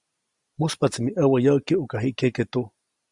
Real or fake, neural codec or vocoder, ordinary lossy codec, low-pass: real; none; Opus, 64 kbps; 10.8 kHz